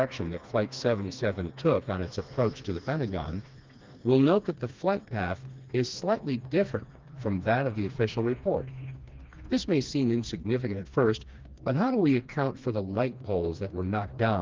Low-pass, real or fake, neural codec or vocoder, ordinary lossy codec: 7.2 kHz; fake; codec, 16 kHz, 2 kbps, FreqCodec, smaller model; Opus, 32 kbps